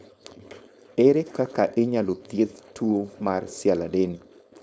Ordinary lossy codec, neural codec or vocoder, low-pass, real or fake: none; codec, 16 kHz, 4.8 kbps, FACodec; none; fake